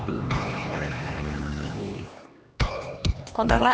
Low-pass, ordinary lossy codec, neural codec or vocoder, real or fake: none; none; codec, 16 kHz, 2 kbps, X-Codec, HuBERT features, trained on LibriSpeech; fake